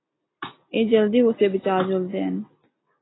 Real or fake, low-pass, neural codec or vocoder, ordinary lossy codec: real; 7.2 kHz; none; AAC, 16 kbps